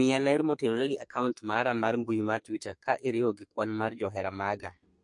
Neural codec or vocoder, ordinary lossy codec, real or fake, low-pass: codec, 32 kHz, 1.9 kbps, SNAC; MP3, 48 kbps; fake; 10.8 kHz